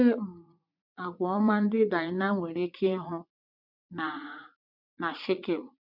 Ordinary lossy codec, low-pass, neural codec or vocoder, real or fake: MP3, 48 kbps; 5.4 kHz; vocoder, 44.1 kHz, 80 mel bands, Vocos; fake